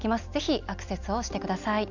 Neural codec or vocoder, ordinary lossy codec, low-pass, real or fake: none; none; 7.2 kHz; real